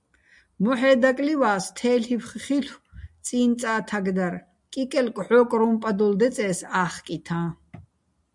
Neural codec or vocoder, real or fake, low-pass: none; real; 10.8 kHz